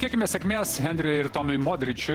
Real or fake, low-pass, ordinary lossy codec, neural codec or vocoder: fake; 19.8 kHz; Opus, 16 kbps; vocoder, 48 kHz, 128 mel bands, Vocos